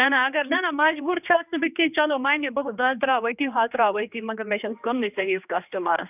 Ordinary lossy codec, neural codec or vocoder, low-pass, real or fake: none; codec, 16 kHz, 2 kbps, X-Codec, HuBERT features, trained on general audio; 3.6 kHz; fake